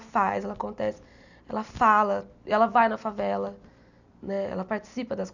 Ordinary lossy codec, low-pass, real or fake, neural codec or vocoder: none; 7.2 kHz; real; none